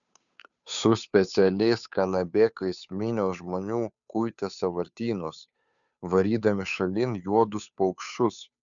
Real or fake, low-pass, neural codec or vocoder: fake; 7.2 kHz; codec, 16 kHz, 2 kbps, FunCodec, trained on Chinese and English, 25 frames a second